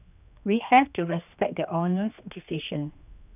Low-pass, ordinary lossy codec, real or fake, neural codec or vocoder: 3.6 kHz; none; fake; codec, 16 kHz, 2 kbps, X-Codec, HuBERT features, trained on general audio